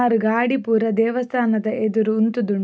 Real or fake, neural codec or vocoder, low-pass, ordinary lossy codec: real; none; none; none